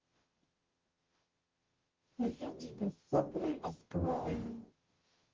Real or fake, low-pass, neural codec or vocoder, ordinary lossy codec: fake; 7.2 kHz; codec, 44.1 kHz, 0.9 kbps, DAC; Opus, 16 kbps